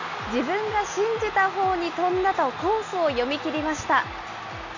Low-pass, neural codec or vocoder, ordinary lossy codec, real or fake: 7.2 kHz; none; none; real